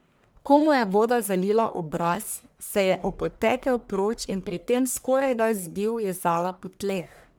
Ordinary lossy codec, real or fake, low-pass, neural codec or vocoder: none; fake; none; codec, 44.1 kHz, 1.7 kbps, Pupu-Codec